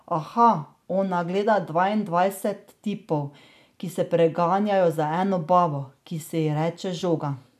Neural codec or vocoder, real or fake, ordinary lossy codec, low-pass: none; real; none; 14.4 kHz